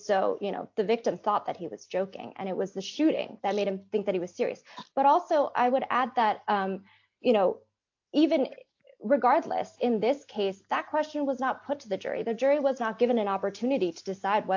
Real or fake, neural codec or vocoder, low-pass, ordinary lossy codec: real; none; 7.2 kHz; AAC, 48 kbps